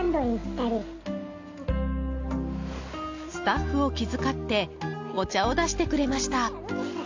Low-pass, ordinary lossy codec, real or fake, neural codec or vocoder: 7.2 kHz; AAC, 48 kbps; real; none